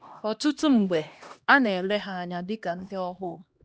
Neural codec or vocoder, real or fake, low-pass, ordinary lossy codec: codec, 16 kHz, 1 kbps, X-Codec, HuBERT features, trained on LibriSpeech; fake; none; none